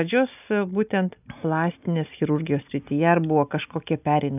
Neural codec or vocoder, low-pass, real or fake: none; 3.6 kHz; real